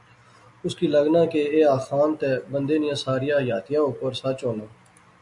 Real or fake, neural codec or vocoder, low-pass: real; none; 10.8 kHz